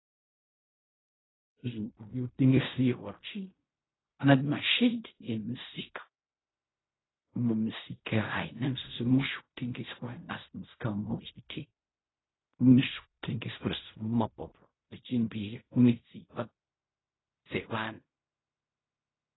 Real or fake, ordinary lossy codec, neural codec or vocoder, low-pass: fake; AAC, 16 kbps; codec, 16 kHz in and 24 kHz out, 0.4 kbps, LongCat-Audio-Codec, fine tuned four codebook decoder; 7.2 kHz